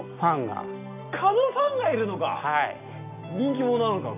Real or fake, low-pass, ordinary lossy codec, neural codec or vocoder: real; 3.6 kHz; none; none